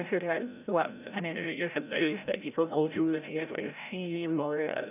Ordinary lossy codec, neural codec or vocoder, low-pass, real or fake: none; codec, 16 kHz, 0.5 kbps, FreqCodec, larger model; 3.6 kHz; fake